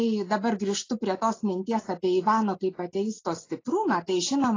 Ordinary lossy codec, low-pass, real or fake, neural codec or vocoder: AAC, 32 kbps; 7.2 kHz; real; none